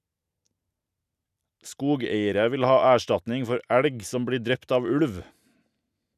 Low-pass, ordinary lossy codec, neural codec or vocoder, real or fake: 14.4 kHz; none; none; real